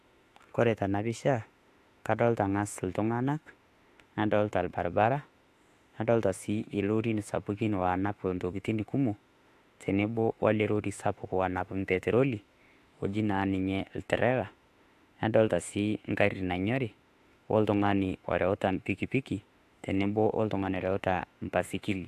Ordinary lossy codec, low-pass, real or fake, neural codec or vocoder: AAC, 64 kbps; 14.4 kHz; fake; autoencoder, 48 kHz, 32 numbers a frame, DAC-VAE, trained on Japanese speech